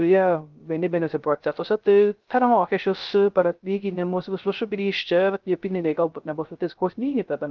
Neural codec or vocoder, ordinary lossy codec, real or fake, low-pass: codec, 16 kHz, 0.3 kbps, FocalCodec; Opus, 24 kbps; fake; 7.2 kHz